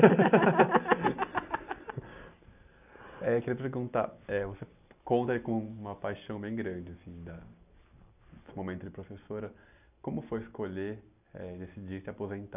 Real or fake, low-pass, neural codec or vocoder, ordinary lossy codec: real; 3.6 kHz; none; none